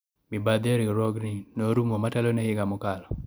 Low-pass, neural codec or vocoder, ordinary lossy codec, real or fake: none; none; none; real